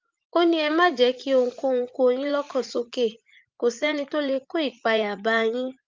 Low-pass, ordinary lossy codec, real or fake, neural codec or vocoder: 7.2 kHz; Opus, 24 kbps; fake; vocoder, 44.1 kHz, 80 mel bands, Vocos